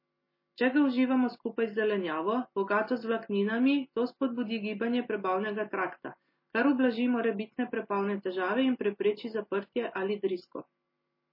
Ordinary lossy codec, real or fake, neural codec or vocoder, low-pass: MP3, 24 kbps; real; none; 5.4 kHz